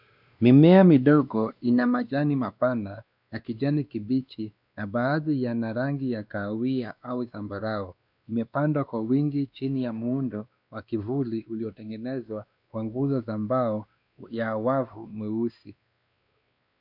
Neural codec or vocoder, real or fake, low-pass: codec, 16 kHz, 2 kbps, X-Codec, WavLM features, trained on Multilingual LibriSpeech; fake; 5.4 kHz